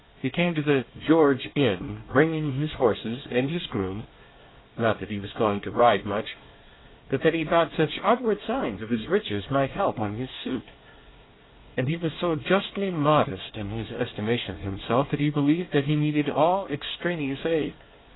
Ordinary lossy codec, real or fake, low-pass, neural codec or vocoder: AAC, 16 kbps; fake; 7.2 kHz; codec, 24 kHz, 1 kbps, SNAC